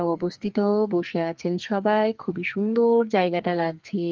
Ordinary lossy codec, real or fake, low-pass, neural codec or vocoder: Opus, 24 kbps; fake; 7.2 kHz; codec, 44.1 kHz, 2.6 kbps, SNAC